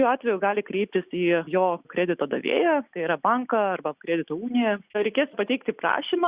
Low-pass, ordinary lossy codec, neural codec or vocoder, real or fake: 3.6 kHz; Opus, 64 kbps; none; real